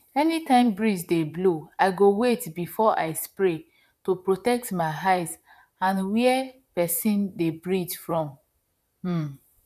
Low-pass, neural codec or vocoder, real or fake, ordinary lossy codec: 14.4 kHz; vocoder, 44.1 kHz, 128 mel bands, Pupu-Vocoder; fake; none